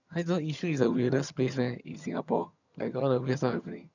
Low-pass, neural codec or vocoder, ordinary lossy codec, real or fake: 7.2 kHz; vocoder, 22.05 kHz, 80 mel bands, HiFi-GAN; none; fake